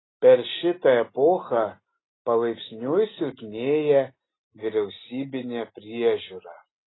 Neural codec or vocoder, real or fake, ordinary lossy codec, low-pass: none; real; AAC, 16 kbps; 7.2 kHz